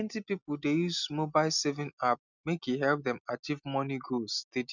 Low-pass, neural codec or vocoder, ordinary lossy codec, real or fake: 7.2 kHz; none; none; real